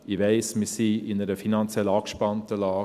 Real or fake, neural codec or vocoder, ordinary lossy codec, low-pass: real; none; none; 14.4 kHz